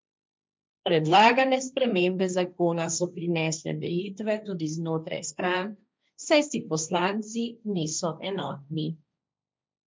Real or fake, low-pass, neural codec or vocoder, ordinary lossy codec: fake; none; codec, 16 kHz, 1.1 kbps, Voila-Tokenizer; none